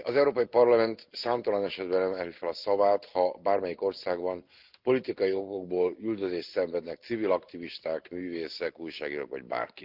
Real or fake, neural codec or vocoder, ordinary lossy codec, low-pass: real; none; Opus, 32 kbps; 5.4 kHz